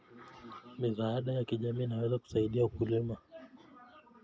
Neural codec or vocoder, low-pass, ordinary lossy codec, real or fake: none; none; none; real